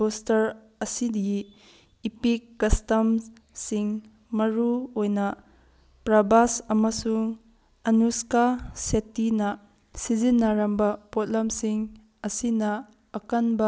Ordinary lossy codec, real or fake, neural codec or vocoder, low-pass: none; real; none; none